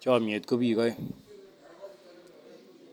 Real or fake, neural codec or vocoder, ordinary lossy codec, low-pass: real; none; none; none